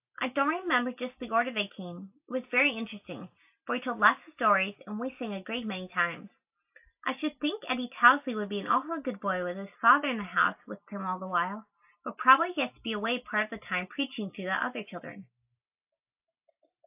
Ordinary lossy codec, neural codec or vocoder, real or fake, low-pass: AAC, 32 kbps; none; real; 3.6 kHz